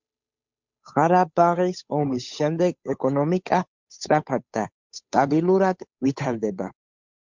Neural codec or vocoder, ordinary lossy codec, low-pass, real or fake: codec, 16 kHz, 8 kbps, FunCodec, trained on Chinese and English, 25 frames a second; MP3, 64 kbps; 7.2 kHz; fake